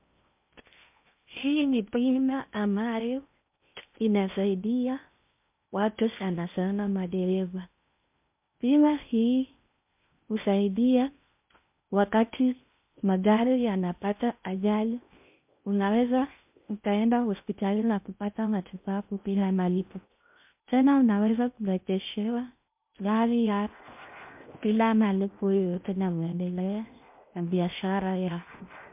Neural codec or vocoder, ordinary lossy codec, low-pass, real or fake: codec, 16 kHz in and 24 kHz out, 0.6 kbps, FocalCodec, streaming, 2048 codes; MP3, 32 kbps; 3.6 kHz; fake